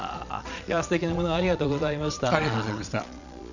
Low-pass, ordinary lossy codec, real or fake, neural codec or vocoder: 7.2 kHz; none; fake; vocoder, 22.05 kHz, 80 mel bands, Vocos